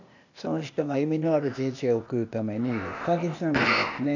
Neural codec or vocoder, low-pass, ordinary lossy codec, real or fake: codec, 16 kHz, 0.8 kbps, ZipCodec; 7.2 kHz; none; fake